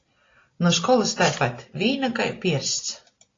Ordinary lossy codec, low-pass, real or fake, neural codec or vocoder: AAC, 32 kbps; 7.2 kHz; real; none